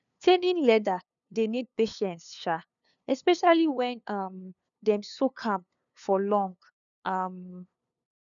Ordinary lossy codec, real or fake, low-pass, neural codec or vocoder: none; fake; 7.2 kHz; codec, 16 kHz, 2 kbps, FunCodec, trained on LibriTTS, 25 frames a second